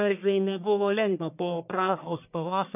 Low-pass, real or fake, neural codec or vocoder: 3.6 kHz; fake; codec, 44.1 kHz, 1.7 kbps, Pupu-Codec